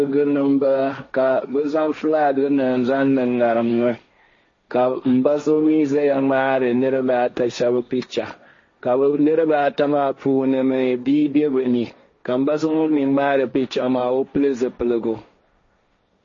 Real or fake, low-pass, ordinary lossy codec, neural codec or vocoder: fake; 7.2 kHz; MP3, 32 kbps; codec, 16 kHz, 1.1 kbps, Voila-Tokenizer